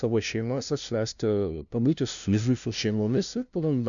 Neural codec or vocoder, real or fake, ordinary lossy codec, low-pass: codec, 16 kHz, 0.5 kbps, FunCodec, trained on LibriTTS, 25 frames a second; fake; MP3, 96 kbps; 7.2 kHz